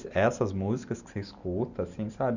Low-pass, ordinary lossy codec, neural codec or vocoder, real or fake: 7.2 kHz; none; none; real